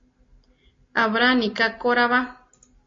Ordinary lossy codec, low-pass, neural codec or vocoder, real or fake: AAC, 32 kbps; 7.2 kHz; none; real